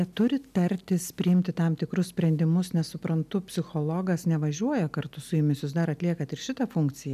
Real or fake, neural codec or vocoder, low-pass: real; none; 14.4 kHz